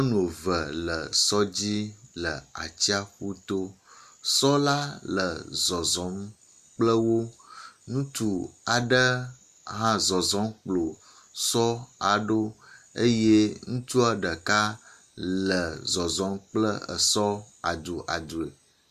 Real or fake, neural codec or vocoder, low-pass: real; none; 14.4 kHz